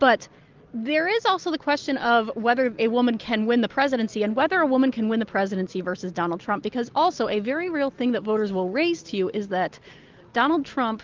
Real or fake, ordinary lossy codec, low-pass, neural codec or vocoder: real; Opus, 24 kbps; 7.2 kHz; none